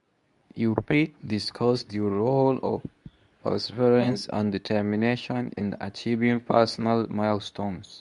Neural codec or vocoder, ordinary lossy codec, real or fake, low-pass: codec, 24 kHz, 0.9 kbps, WavTokenizer, medium speech release version 2; none; fake; 10.8 kHz